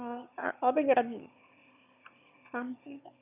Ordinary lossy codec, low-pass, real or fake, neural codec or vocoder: none; 3.6 kHz; fake; autoencoder, 22.05 kHz, a latent of 192 numbers a frame, VITS, trained on one speaker